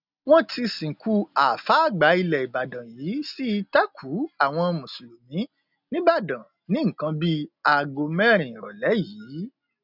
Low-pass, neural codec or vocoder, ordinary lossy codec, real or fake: 5.4 kHz; none; none; real